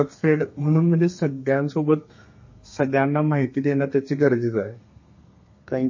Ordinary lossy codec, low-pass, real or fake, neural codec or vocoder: MP3, 32 kbps; 7.2 kHz; fake; codec, 32 kHz, 1.9 kbps, SNAC